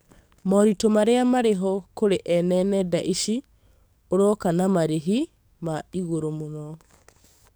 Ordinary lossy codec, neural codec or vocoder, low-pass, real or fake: none; codec, 44.1 kHz, 7.8 kbps, DAC; none; fake